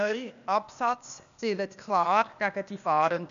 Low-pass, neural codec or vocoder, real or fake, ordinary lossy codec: 7.2 kHz; codec, 16 kHz, 0.8 kbps, ZipCodec; fake; none